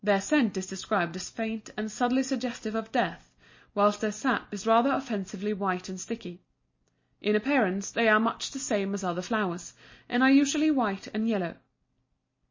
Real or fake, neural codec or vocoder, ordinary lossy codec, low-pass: real; none; MP3, 32 kbps; 7.2 kHz